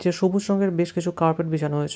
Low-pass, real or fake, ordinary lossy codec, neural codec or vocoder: none; real; none; none